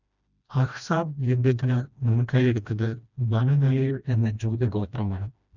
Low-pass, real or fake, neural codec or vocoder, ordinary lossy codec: 7.2 kHz; fake; codec, 16 kHz, 1 kbps, FreqCodec, smaller model; none